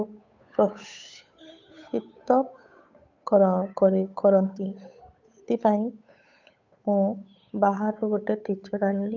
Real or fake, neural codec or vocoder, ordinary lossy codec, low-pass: fake; codec, 16 kHz, 8 kbps, FunCodec, trained on Chinese and English, 25 frames a second; MP3, 64 kbps; 7.2 kHz